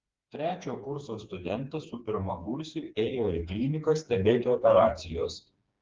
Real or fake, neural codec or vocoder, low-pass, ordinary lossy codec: fake; codec, 16 kHz, 2 kbps, FreqCodec, smaller model; 7.2 kHz; Opus, 32 kbps